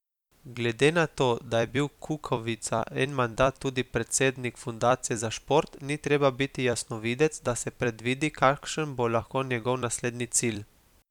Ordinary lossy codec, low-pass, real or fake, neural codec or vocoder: none; 19.8 kHz; fake; vocoder, 44.1 kHz, 128 mel bands every 256 samples, BigVGAN v2